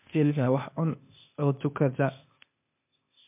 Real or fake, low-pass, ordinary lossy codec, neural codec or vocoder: fake; 3.6 kHz; MP3, 32 kbps; codec, 16 kHz, 0.8 kbps, ZipCodec